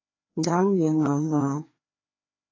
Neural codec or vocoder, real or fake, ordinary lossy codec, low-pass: codec, 16 kHz, 2 kbps, FreqCodec, larger model; fake; AAC, 32 kbps; 7.2 kHz